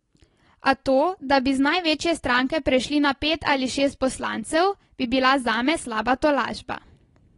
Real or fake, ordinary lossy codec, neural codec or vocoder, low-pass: real; AAC, 32 kbps; none; 10.8 kHz